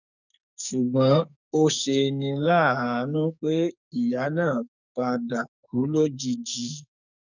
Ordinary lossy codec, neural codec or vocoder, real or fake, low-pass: none; codec, 44.1 kHz, 2.6 kbps, SNAC; fake; 7.2 kHz